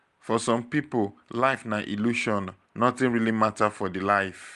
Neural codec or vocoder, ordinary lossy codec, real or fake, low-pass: none; Opus, 32 kbps; real; 10.8 kHz